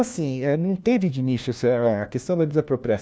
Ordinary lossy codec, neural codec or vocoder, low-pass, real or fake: none; codec, 16 kHz, 1 kbps, FunCodec, trained on LibriTTS, 50 frames a second; none; fake